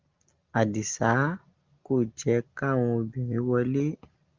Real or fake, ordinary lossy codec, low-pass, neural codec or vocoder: real; Opus, 24 kbps; 7.2 kHz; none